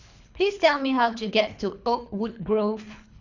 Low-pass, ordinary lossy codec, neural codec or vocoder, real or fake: 7.2 kHz; none; codec, 24 kHz, 3 kbps, HILCodec; fake